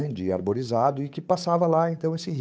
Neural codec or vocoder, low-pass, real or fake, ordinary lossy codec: codec, 16 kHz, 8 kbps, FunCodec, trained on Chinese and English, 25 frames a second; none; fake; none